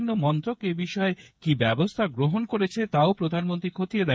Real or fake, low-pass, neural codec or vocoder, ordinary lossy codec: fake; none; codec, 16 kHz, 8 kbps, FreqCodec, smaller model; none